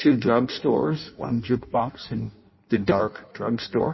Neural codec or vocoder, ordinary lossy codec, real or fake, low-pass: codec, 16 kHz in and 24 kHz out, 0.6 kbps, FireRedTTS-2 codec; MP3, 24 kbps; fake; 7.2 kHz